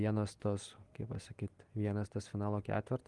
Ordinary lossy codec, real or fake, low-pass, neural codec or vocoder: Opus, 24 kbps; real; 10.8 kHz; none